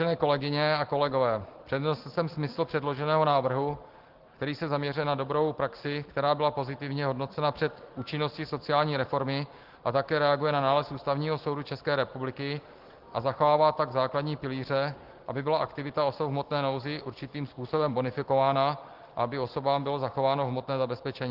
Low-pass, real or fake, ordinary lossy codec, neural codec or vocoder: 5.4 kHz; real; Opus, 16 kbps; none